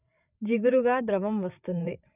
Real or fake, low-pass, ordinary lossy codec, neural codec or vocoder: fake; 3.6 kHz; none; codec, 16 kHz, 8 kbps, FreqCodec, larger model